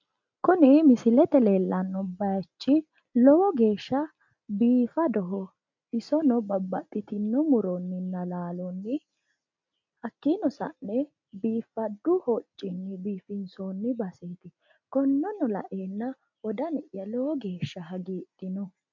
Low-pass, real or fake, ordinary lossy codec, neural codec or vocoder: 7.2 kHz; real; MP3, 64 kbps; none